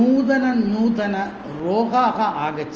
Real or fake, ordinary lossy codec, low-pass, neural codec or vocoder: real; Opus, 24 kbps; 7.2 kHz; none